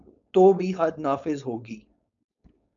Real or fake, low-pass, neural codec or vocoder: fake; 7.2 kHz; codec, 16 kHz, 4.8 kbps, FACodec